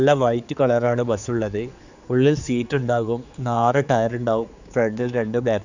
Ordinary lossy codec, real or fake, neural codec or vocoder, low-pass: none; fake; codec, 16 kHz, 4 kbps, X-Codec, HuBERT features, trained on general audio; 7.2 kHz